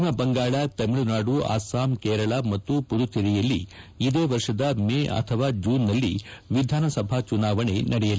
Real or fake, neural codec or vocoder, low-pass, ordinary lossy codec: real; none; none; none